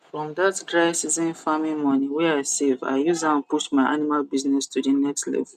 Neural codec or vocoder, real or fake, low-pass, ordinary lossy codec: none; real; 14.4 kHz; none